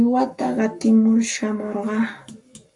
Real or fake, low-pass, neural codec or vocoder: fake; 10.8 kHz; codec, 44.1 kHz, 7.8 kbps, Pupu-Codec